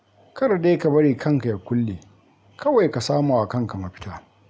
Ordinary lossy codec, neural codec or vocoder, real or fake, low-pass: none; none; real; none